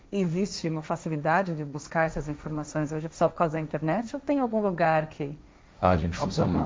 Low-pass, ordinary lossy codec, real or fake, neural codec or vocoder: none; none; fake; codec, 16 kHz, 1.1 kbps, Voila-Tokenizer